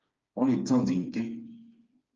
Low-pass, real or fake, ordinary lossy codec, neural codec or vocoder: 7.2 kHz; fake; Opus, 24 kbps; codec, 16 kHz, 4 kbps, FreqCodec, smaller model